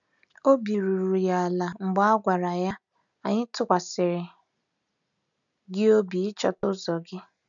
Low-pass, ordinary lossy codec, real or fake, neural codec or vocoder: 7.2 kHz; none; real; none